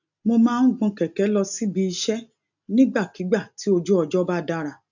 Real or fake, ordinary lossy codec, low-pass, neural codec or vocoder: real; none; 7.2 kHz; none